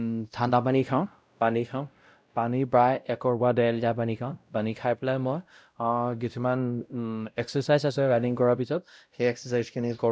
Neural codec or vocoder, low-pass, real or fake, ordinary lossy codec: codec, 16 kHz, 0.5 kbps, X-Codec, WavLM features, trained on Multilingual LibriSpeech; none; fake; none